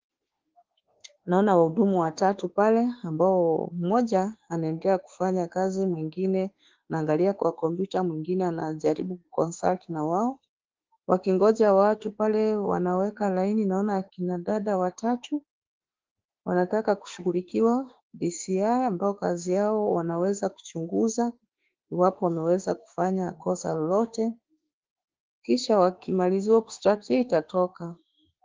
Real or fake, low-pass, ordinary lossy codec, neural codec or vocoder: fake; 7.2 kHz; Opus, 16 kbps; autoencoder, 48 kHz, 32 numbers a frame, DAC-VAE, trained on Japanese speech